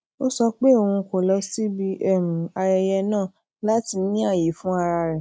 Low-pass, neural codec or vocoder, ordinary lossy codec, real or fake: none; none; none; real